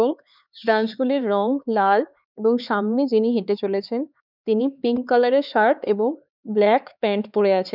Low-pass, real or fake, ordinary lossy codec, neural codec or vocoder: 5.4 kHz; fake; none; codec, 16 kHz, 4 kbps, X-Codec, HuBERT features, trained on LibriSpeech